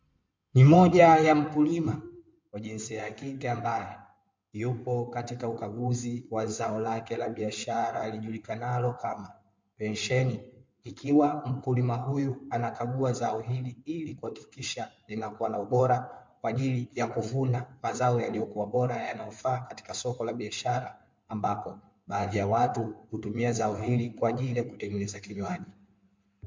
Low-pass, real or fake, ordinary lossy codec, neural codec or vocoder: 7.2 kHz; fake; MP3, 64 kbps; codec, 16 kHz in and 24 kHz out, 2.2 kbps, FireRedTTS-2 codec